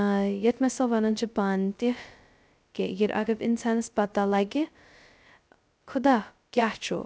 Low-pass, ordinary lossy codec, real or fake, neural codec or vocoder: none; none; fake; codec, 16 kHz, 0.2 kbps, FocalCodec